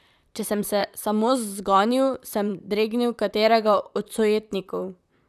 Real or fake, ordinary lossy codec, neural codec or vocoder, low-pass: fake; none; vocoder, 44.1 kHz, 128 mel bands, Pupu-Vocoder; 14.4 kHz